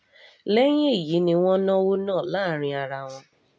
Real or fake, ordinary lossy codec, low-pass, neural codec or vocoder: real; none; none; none